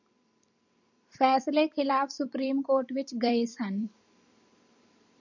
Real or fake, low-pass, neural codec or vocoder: fake; 7.2 kHz; vocoder, 44.1 kHz, 128 mel bands every 256 samples, BigVGAN v2